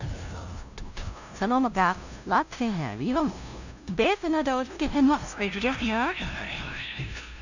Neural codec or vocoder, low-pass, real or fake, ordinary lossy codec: codec, 16 kHz, 0.5 kbps, FunCodec, trained on LibriTTS, 25 frames a second; 7.2 kHz; fake; none